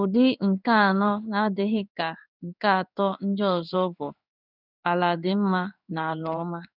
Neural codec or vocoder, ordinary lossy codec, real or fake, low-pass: codec, 16 kHz in and 24 kHz out, 1 kbps, XY-Tokenizer; none; fake; 5.4 kHz